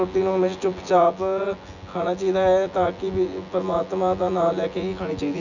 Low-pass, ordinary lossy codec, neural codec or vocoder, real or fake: 7.2 kHz; none; vocoder, 24 kHz, 100 mel bands, Vocos; fake